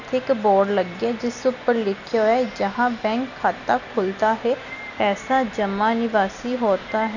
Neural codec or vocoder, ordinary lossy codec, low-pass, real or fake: none; none; 7.2 kHz; real